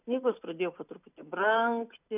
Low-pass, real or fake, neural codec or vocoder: 3.6 kHz; real; none